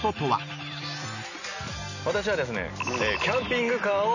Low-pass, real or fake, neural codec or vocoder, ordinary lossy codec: 7.2 kHz; real; none; none